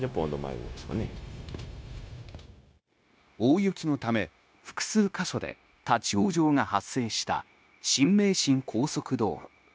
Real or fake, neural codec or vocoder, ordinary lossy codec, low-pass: fake; codec, 16 kHz, 0.9 kbps, LongCat-Audio-Codec; none; none